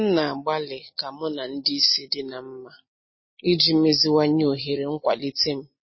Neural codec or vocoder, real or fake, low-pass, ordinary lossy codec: none; real; 7.2 kHz; MP3, 24 kbps